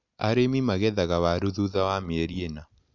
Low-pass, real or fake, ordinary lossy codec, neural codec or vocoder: 7.2 kHz; real; none; none